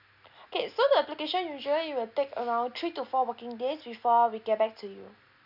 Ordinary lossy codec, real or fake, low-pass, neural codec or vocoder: none; real; 5.4 kHz; none